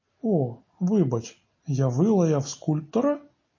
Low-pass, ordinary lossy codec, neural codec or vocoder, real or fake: 7.2 kHz; MP3, 32 kbps; none; real